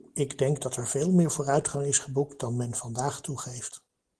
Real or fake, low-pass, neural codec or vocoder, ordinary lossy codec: real; 10.8 kHz; none; Opus, 16 kbps